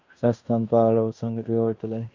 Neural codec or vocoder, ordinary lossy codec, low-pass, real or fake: codec, 16 kHz in and 24 kHz out, 0.9 kbps, LongCat-Audio-Codec, fine tuned four codebook decoder; MP3, 64 kbps; 7.2 kHz; fake